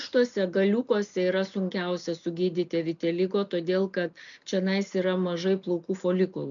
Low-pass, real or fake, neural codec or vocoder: 7.2 kHz; real; none